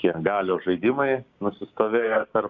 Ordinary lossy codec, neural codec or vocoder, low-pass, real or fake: Opus, 64 kbps; codec, 16 kHz, 6 kbps, DAC; 7.2 kHz; fake